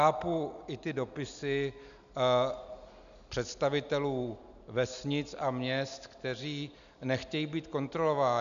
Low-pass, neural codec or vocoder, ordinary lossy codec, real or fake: 7.2 kHz; none; MP3, 96 kbps; real